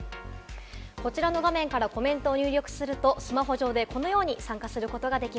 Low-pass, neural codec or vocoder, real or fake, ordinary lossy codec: none; none; real; none